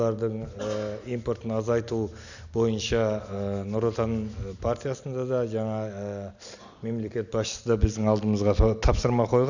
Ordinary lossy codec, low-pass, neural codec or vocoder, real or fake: none; 7.2 kHz; none; real